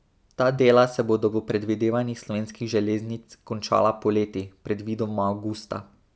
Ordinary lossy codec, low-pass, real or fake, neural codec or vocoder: none; none; real; none